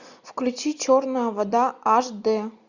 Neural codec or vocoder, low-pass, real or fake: none; 7.2 kHz; real